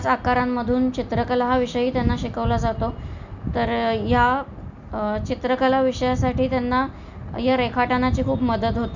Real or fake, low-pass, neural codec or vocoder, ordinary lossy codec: real; 7.2 kHz; none; none